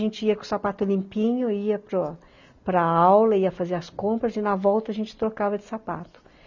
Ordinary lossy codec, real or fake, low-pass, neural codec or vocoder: none; real; 7.2 kHz; none